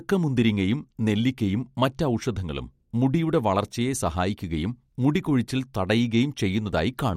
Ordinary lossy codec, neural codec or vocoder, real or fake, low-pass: MP3, 64 kbps; none; real; 19.8 kHz